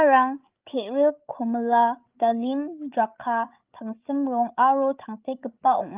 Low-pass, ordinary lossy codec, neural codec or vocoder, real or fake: 3.6 kHz; Opus, 32 kbps; codec, 16 kHz, 8 kbps, FreqCodec, larger model; fake